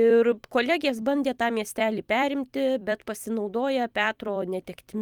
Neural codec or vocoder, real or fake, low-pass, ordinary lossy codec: vocoder, 44.1 kHz, 128 mel bands every 256 samples, BigVGAN v2; fake; 19.8 kHz; Opus, 32 kbps